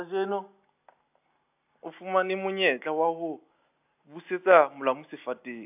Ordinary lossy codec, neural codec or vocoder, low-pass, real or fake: AAC, 32 kbps; none; 3.6 kHz; real